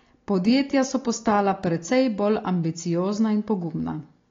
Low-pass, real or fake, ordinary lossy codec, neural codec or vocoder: 7.2 kHz; real; AAC, 32 kbps; none